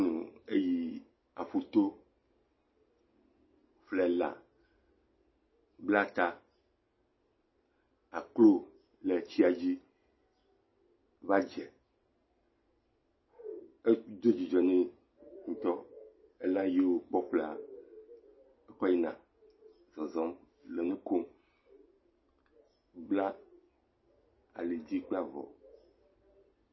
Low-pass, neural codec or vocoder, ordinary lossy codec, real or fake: 7.2 kHz; none; MP3, 24 kbps; real